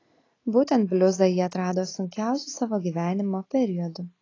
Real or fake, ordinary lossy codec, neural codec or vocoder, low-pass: real; AAC, 32 kbps; none; 7.2 kHz